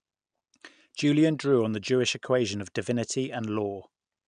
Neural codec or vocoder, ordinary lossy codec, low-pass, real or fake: none; none; 9.9 kHz; real